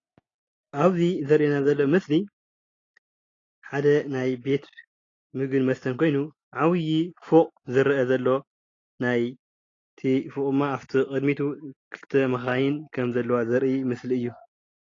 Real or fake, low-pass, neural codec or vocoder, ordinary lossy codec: real; 7.2 kHz; none; AAC, 32 kbps